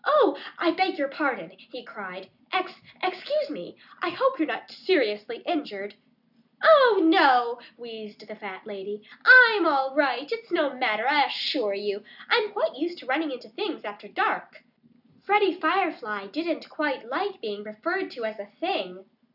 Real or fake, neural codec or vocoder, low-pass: real; none; 5.4 kHz